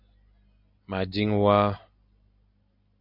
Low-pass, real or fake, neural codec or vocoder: 5.4 kHz; real; none